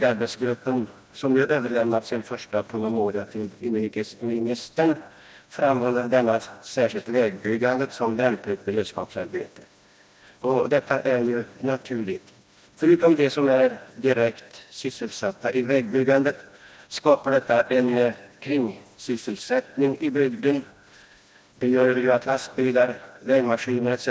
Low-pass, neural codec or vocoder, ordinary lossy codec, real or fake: none; codec, 16 kHz, 1 kbps, FreqCodec, smaller model; none; fake